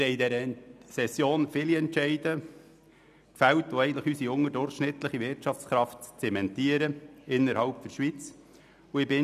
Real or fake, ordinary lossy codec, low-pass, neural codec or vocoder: real; none; 14.4 kHz; none